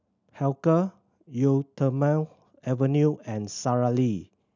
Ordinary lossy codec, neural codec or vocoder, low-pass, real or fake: none; none; 7.2 kHz; real